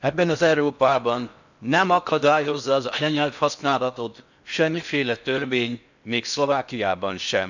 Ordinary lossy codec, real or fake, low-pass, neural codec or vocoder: none; fake; 7.2 kHz; codec, 16 kHz in and 24 kHz out, 0.6 kbps, FocalCodec, streaming, 4096 codes